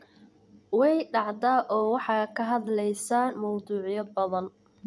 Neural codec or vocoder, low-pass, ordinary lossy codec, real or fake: vocoder, 24 kHz, 100 mel bands, Vocos; none; none; fake